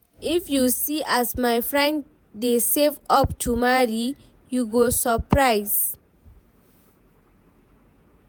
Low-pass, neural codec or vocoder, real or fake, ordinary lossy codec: none; vocoder, 48 kHz, 128 mel bands, Vocos; fake; none